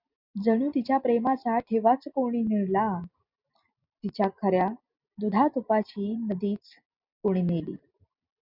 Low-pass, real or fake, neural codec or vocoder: 5.4 kHz; real; none